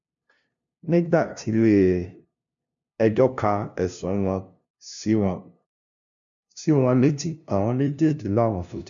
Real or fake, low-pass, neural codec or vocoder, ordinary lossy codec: fake; 7.2 kHz; codec, 16 kHz, 0.5 kbps, FunCodec, trained on LibriTTS, 25 frames a second; none